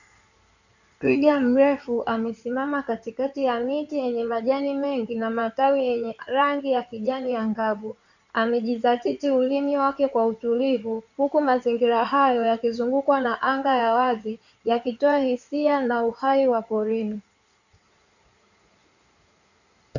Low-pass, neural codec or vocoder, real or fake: 7.2 kHz; codec, 16 kHz in and 24 kHz out, 2.2 kbps, FireRedTTS-2 codec; fake